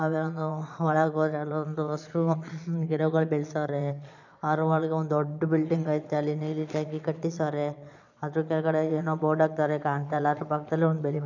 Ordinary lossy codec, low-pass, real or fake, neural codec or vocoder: none; 7.2 kHz; fake; vocoder, 22.05 kHz, 80 mel bands, Vocos